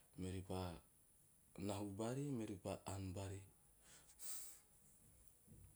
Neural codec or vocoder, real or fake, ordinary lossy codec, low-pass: none; real; none; none